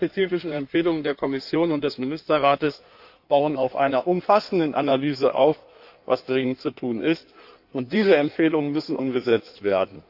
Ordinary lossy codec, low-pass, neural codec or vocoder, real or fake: none; 5.4 kHz; codec, 16 kHz in and 24 kHz out, 1.1 kbps, FireRedTTS-2 codec; fake